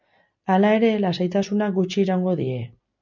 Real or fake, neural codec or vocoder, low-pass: real; none; 7.2 kHz